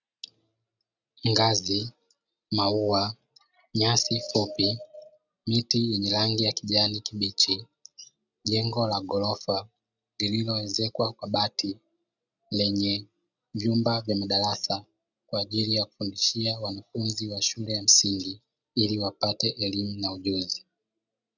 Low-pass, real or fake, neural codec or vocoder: 7.2 kHz; real; none